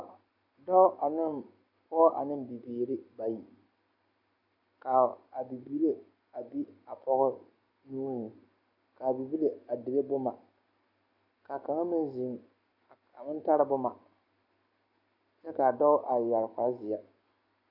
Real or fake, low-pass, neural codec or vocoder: real; 5.4 kHz; none